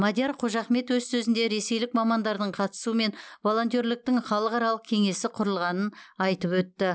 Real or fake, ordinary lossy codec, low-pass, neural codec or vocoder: real; none; none; none